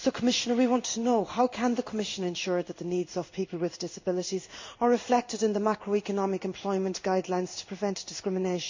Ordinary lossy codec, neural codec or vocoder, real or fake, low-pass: MP3, 48 kbps; codec, 16 kHz in and 24 kHz out, 1 kbps, XY-Tokenizer; fake; 7.2 kHz